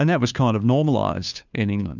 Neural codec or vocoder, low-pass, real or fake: codec, 16 kHz, 2 kbps, FunCodec, trained on Chinese and English, 25 frames a second; 7.2 kHz; fake